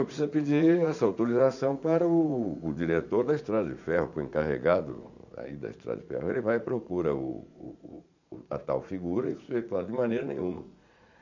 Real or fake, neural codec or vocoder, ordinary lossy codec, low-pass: fake; vocoder, 44.1 kHz, 80 mel bands, Vocos; none; 7.2 kHz